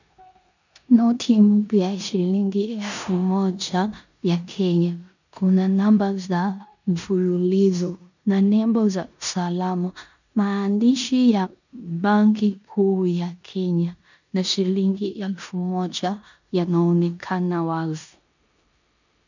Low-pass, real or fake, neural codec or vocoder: 7.2 kHz; fake; codec, 16 kHz in and 24 kHz out, 0.9 kbps, LongCat-Audio-Codec, four codebook decoder